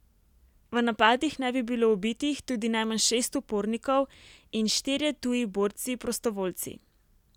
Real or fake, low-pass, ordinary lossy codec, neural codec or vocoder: real; 19.8 kHz; none; none